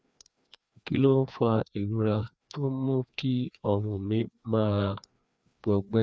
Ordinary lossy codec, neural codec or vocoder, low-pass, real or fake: none; codec, 16 kHz, 2 kbps, FreqCodec, larger model; none; fake